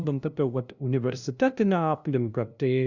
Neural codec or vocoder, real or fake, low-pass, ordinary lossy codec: codec, 16 kHz, 0.5 kbps, FunCodec, trained on LibriTTS, 25 frames a second; fake; 7.2 kHz; Opus, 64 kbps